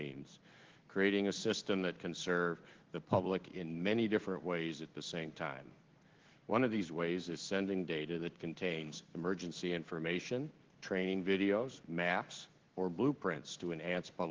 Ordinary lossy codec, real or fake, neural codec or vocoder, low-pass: Opus, 16 kbps; fake; codec, 16 kHz in and 24 kHz out, 1 kbps, XY-Tokenizer; 7.2 kHz